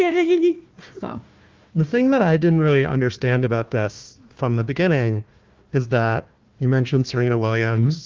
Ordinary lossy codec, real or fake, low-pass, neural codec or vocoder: Opus, 32 kbps; fake; 7.2 kHz; codec, 16 kHz, 1 kbps, FunCodec, trained on Chinese and English, 50 frames a second